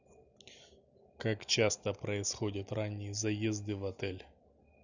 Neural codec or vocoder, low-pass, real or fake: none; 7.2 kHz; real